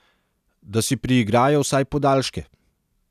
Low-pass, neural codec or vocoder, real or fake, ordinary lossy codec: 14.4 kHz; none; real; none